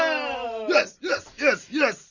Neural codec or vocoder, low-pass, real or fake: none; 7.2 kHz; real